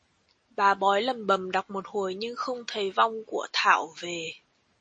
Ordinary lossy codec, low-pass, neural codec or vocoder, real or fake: MP3, 32 kbps; 9.9 kHz; none; real